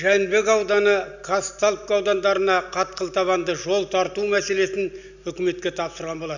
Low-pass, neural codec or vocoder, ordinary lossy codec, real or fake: 7.2 kHz; none; none; real